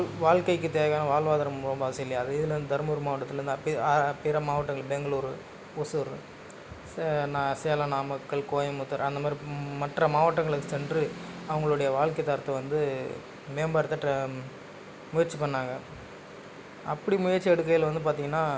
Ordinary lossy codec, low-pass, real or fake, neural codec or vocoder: none; none; real; none